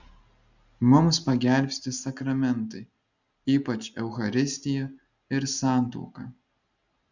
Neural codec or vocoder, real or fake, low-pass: none; real; 7.2 kHz